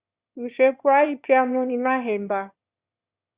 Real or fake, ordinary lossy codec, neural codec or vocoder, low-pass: fake; Opus, 64 kbps; autoencoder, 22.05 kHz, a latent of 192 numbers a frame, VITS, trained on one speaker; 3.6 kHz